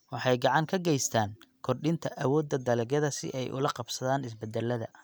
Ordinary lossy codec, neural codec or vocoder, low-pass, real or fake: none; none; none; real